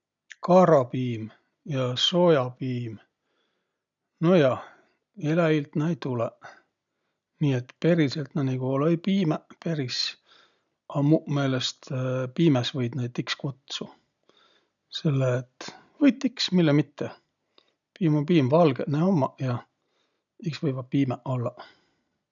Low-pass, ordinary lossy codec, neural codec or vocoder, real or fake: 7.2 kHz; none; none; real